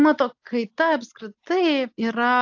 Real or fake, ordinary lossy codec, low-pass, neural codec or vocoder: real; AAC, 48 kbps; 7.2 kHz; none